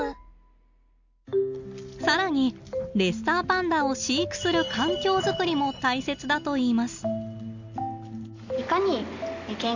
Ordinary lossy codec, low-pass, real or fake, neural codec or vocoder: Opus, 64 kbps; 7.2 kHz; real; none